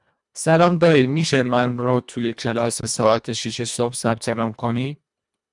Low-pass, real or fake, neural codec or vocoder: 10.8 kHz; fake; codec, 24 kHz, 1.5 kbps, HILCodec